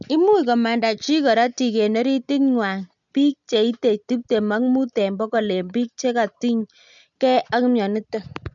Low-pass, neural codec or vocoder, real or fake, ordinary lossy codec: 7.2 kHz; none; real; none